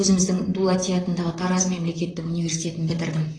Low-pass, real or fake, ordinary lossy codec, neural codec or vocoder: 9.9 kHz; fake; AAC, 32 kbps; vocoder, 44.1 kHz, 128 mel bands, Pupu-Vocoder